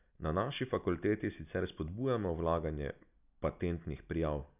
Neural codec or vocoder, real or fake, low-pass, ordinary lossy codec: none; real; 3.6 kHz; none